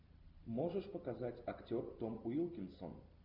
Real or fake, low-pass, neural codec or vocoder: real; 5.4 kHz; none